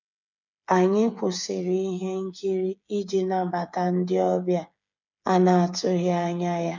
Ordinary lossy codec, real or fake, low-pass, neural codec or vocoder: none; fake; 7.2 kHz; codec, 16 kHz, 8 kbps, FreqCodec, smaller model